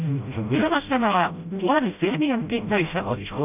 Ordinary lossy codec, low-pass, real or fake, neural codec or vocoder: none; 3.6 kHz; fake; codec, 16 kHz, 0.5 kbps, FreqCodec, smaller model